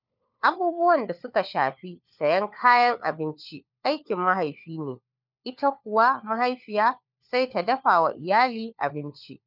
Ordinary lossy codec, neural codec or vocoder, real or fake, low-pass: none; codec, 16 kHz, 4 kbps, FunCodec, trained on LibriTTS, 50 frames a second; fake; 5.4 kHz